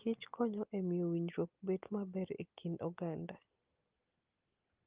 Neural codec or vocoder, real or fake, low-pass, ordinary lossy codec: none; real; 3.6 kHz; Opus, 32 kbps